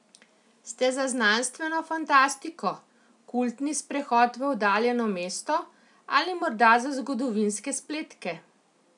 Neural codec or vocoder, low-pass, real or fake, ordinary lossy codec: none; 10.8 kHz; real; none